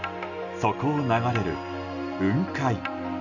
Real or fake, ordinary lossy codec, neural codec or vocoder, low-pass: real; none; none; 7.2 kHz